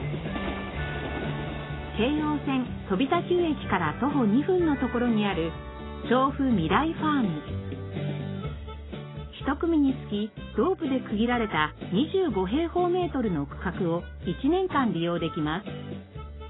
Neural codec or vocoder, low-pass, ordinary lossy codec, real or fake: none; 7.2 kHz; AAC, 16 kbps; real